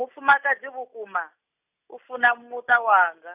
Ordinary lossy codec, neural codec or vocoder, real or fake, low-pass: none; none; real; 3.6 kHz